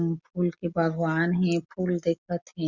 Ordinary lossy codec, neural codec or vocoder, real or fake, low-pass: Opus, 64 kbps; none; real; 7.2 kHz